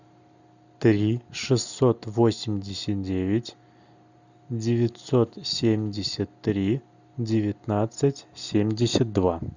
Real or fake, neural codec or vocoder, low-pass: real; none; 7.2 kHz